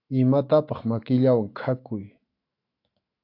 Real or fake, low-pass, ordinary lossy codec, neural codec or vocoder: fake; 5.4 kHz; AAC, 32 kbps; autoencoder, 48 kHz, 128 numbers a frame, DAC-VAE, trained on Japanese speech